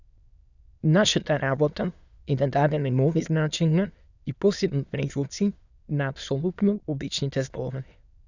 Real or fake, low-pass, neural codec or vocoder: fake; 7.2 kHz; autoencoder, 22.05 kHz, a latent of 192 numbers a frame, VITS, trained on many speakers